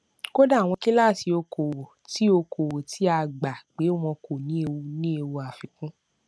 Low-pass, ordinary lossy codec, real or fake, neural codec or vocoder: none; none; real; none